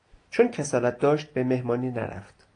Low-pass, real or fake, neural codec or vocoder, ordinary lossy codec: 9.9 kHz; real; none; AAC, 48 kbps